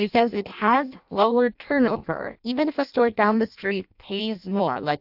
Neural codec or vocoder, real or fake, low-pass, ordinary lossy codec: codec, 16 kHz in and 24 kHz out, 0.6 kbps, FireRedTTS-2 codec; fake; 5.4 kHz; AAC, 48 kbps